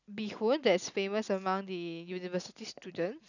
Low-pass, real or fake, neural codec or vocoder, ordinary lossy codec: 7.2 kHz; real; none; none